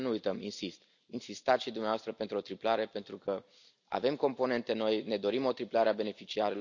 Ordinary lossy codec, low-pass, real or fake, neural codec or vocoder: none; 7.2 kHz; real; none